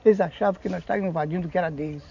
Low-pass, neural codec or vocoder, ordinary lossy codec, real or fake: 7.2 kHz; none; none; real